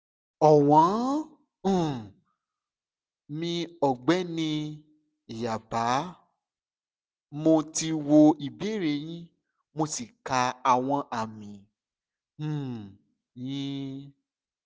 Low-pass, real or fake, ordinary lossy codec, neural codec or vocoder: none; real; none; none